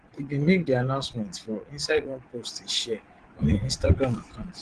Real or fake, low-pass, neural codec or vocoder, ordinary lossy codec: fake; 9.9 kHz; vocoder, 22.05 kHz, 80 mel bands, Vocos; Opus, 16 kbps